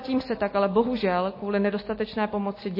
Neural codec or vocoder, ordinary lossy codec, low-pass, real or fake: none; MP3, 24 kbps; 5.4 kHz; real